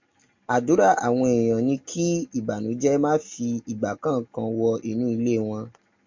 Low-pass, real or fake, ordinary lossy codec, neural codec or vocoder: 7.2 kHz; real; MP3, 48 kbps; none